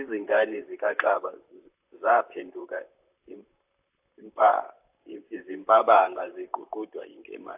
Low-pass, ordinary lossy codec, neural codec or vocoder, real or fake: 3.6 kHz; AAC, 32 kbps; vocoder, 44.1 kHz, 128 mel bands, Pupu-Vocoder; fake